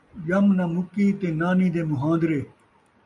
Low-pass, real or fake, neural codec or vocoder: 10.8 kHz; real; none